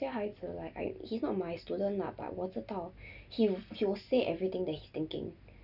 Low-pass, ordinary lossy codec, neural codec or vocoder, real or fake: 5.4 kHz; none; none; real